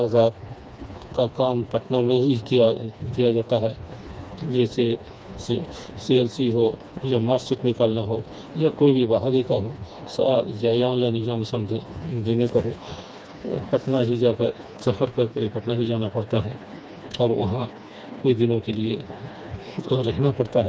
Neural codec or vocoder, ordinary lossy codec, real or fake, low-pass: codec, 16 kHz, 2 kbps, FreqCodec, smaller model; none; fake; none